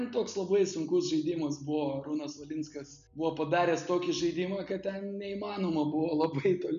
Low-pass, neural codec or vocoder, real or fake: 7.2 kHz; none; real